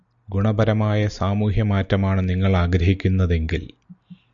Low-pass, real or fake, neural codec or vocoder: 7.2 kHz; real; none